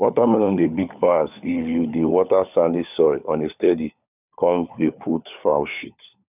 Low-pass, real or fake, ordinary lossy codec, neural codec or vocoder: 3.6 kHz; fake; none; codec, 16 kHz, 4 kbps, FunCodec, trained on LibriTTS, 50 frames a second